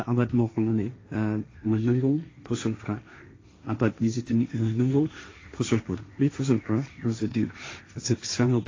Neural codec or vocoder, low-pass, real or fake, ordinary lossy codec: codec, 16 kHz, 1.1 kbps, Voila-Tokenizer; 7.2 kHz; fake; AAC, 32 kbps